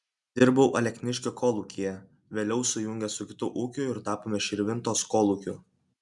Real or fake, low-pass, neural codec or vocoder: real; 10.8 kHz; none